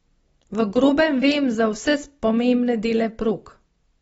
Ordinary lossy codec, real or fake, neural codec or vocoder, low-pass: AAC, 24 kbps; real; none; 19.8 kHz